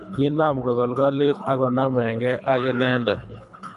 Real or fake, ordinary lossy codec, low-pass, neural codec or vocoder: fake; none; 10.8 kHz; codec, 24 kHz, 3 kbps, HILCodec